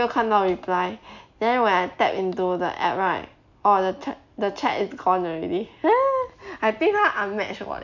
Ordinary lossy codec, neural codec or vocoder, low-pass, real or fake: none; none; 7.2 kHz; real